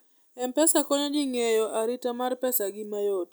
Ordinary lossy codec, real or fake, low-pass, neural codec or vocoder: none; real; none; none